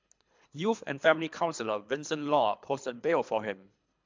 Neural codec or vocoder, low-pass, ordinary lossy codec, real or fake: codec, 24 kHz, 3 kbps, HILCodec; 7.2 kHz; MP3, 64 kbps; fake